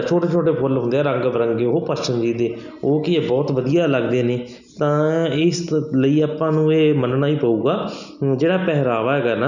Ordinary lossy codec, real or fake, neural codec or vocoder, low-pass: none; real; none; 7.2 kHz